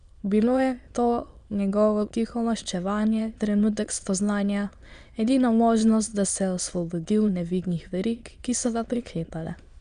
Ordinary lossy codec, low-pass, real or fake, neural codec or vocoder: Opus, 64 kbps; 9.9 kHz; fake; autoencoder, 22.05 kHz, a latent of 192 numbers a frame, VITS, trained on many speakers